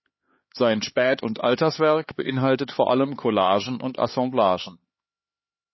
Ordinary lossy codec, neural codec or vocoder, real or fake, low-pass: MP3, 24 kbps; codec, 16 kHz, 4 kbps, X-Codec, HuBERT features, trained on LibriSpeech; fake; 7.2 kHz